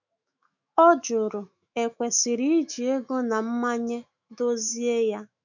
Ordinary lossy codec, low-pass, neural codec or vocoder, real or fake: none; 7.2 kHz; autoencoder, 48 kHz, 128 numbers a frame, DAC-VAE, trained on Japanese speech; fake